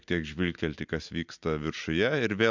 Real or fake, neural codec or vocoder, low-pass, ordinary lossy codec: real; none; 7.2 kHz; MP3, 64 kbps